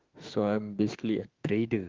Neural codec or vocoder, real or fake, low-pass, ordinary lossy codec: autoencoder, 48 kHz, 32 numbers a frame, DAC-VAE, trained on Japanese speech; fake; 7.2 kHz; Opus, 24 kbps